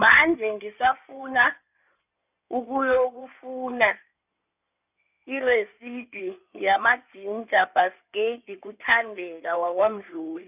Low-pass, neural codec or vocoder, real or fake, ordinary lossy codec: 3.6 kHz; codec, 16 kHz in and 24 kHz out, 2.2 kbps, FireRedTTS-2 codec; fake; none